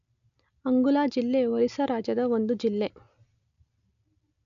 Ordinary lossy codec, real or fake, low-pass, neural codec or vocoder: none; real; 7.2 kHz; none